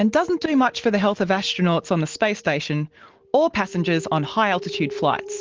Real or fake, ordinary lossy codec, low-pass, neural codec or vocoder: real; Opus, 16 kbps; 7.2 kHz; none